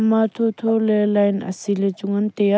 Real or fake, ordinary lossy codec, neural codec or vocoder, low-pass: real; none; none; none